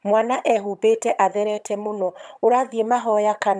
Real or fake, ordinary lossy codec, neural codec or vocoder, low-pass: fake; none; vocoder, 22.05 kHz, 80 mel bands, HiFi-GAN; none